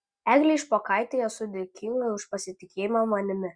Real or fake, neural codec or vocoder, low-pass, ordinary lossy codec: real; none; 9.9 kHz; Opus, 64 kbps